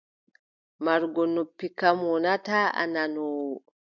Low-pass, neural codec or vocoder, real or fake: 7.2 kHz; none; real